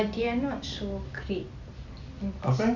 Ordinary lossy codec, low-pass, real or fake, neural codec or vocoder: none; 7.2 kHz; real; none